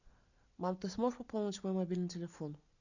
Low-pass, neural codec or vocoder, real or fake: 7.2 kHz; none; real